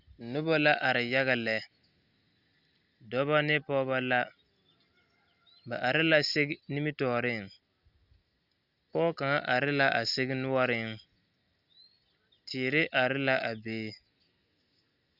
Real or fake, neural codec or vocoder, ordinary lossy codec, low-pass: real; none; Opus, 64 kbps; 5.4 kHz